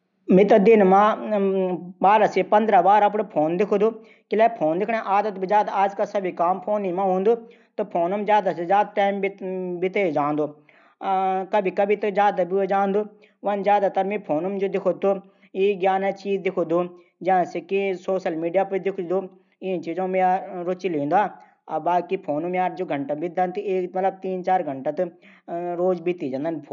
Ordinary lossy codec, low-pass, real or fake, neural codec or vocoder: none; 7.2 kHz; real; none